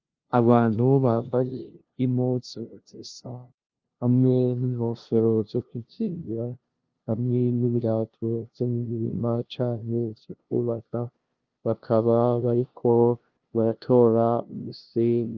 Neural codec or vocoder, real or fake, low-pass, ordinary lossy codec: codec, 16 kHz, 0.5 kbps, FunCodec, trained on LibriTTS, 25 frames a second; fake; 7.2 kHz; Opus, 32 kbps